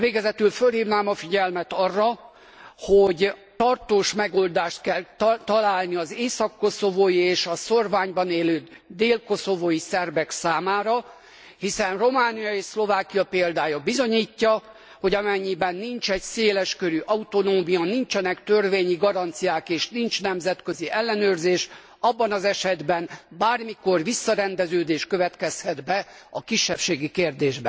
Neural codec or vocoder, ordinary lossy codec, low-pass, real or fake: none; none; none; real